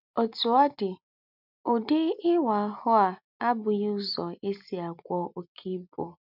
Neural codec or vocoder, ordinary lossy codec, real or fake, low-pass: none; none; real; 5.4 kHz